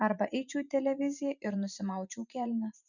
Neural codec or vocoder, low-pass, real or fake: vocoder, 44.1 kHz, 128 mel bands every 512 samples, BigVGAN v2; 7.2 kHz; fake